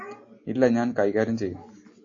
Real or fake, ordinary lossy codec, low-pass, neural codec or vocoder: real; AAC, 48 kbps; 7.2 kHz; none